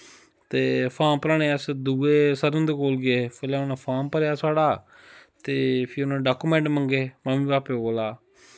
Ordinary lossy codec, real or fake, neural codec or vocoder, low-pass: none; real; none; none